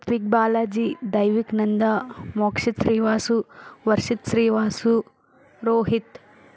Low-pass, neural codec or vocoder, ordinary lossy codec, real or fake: none; none; none; real